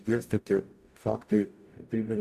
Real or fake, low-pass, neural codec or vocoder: fake; 14.4 kHz; codec, 44.1 kHz, 0.9 kbps, DAC